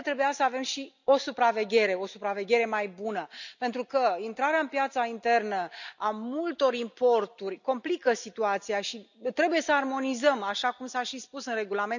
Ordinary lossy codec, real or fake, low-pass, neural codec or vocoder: none; real; 7.2 kHz; none